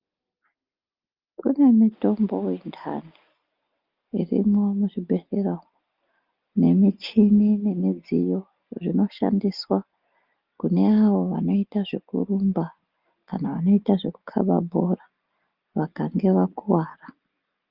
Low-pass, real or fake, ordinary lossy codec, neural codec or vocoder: 5.4 kHz; real; Opus, 24 kbps; none